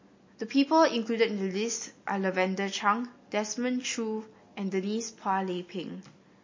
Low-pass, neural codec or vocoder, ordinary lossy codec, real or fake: 7.2 kHz; none; MP3, 32 kbps; real